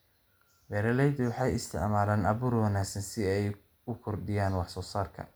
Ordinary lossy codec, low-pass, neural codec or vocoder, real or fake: none; none; none; real